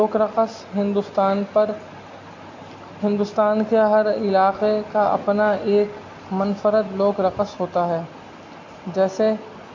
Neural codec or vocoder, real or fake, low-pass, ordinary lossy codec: none; real; 7.2 kHz; AAC, 48 kbps